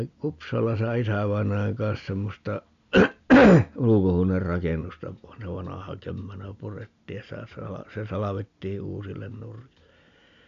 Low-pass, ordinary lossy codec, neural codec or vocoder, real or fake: 7.2 kHz; AAC, 64 kbps; none; real